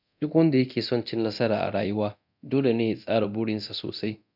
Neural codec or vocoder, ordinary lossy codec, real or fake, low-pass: codec, 24 kHz, 0.9 kbps, DualCodec; none; fake; 5.4 kHz